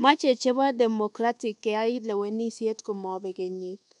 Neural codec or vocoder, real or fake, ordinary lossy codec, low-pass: codec, 24 kHz, 1.2 kbps, DualCodec; fake; none; 10.8 kHz